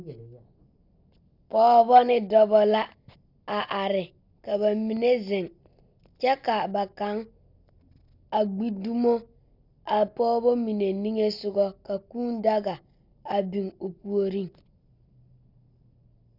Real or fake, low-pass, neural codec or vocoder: real; 5.4 kHz; none